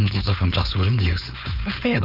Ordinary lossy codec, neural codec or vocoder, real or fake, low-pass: none; codec, 16 kHz, 4.8 kbps, FACodec; fake; 5.4 kHz